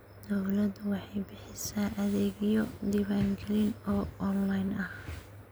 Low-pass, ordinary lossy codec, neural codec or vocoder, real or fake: none; none; none; real